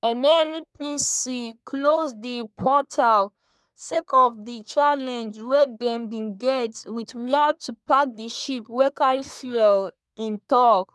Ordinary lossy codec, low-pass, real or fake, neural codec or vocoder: none; none; fake; codec, 24 kHz, 1 kbps, SNAC